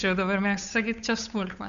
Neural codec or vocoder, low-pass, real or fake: codec, 16 kHz, 8 kbps, FunCodec, trained on Chinese and English, 25 frames a second; 7.2 kHz; fake